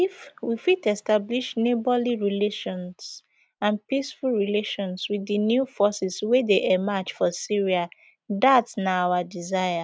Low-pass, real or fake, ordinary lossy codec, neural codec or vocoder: none; real; none; none